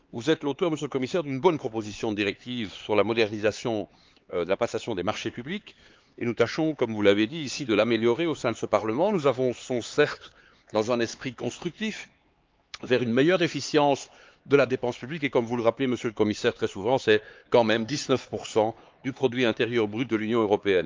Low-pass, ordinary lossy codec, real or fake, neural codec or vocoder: 7.2 kHz; Opus, 24 kbps; fake; codec, 16 kHz, 4 kbps, X-Codec, HuBERT features, trained on LibriSpeech